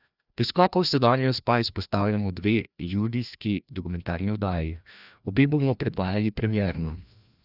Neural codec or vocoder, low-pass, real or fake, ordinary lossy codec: codec, 16 kHz, 1 kbps, FreqCodec, larger model; 5.4 kHz; fake; none